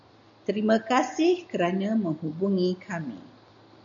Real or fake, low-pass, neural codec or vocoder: real; 7.2 kHz; none